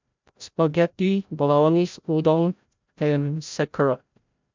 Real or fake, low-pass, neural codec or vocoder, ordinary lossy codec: fake; 7.2 kHz; codec, 16 kHz, 0.5 kbps, FreqCodec, larger model; MP3, 64 kbps